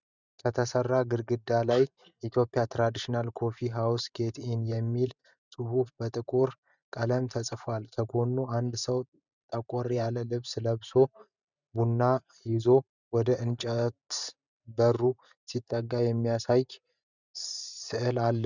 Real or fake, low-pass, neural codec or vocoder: fake; 7.2 kHz; vocoder, 44.1 kHz, 128 mel bands every 512 samples, BigVGAN v2